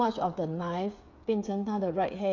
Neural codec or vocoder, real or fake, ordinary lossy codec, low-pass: codec, 16 kHz, 8 kbps, FreqCodec, smaller model; fake; none; 7.2 kHz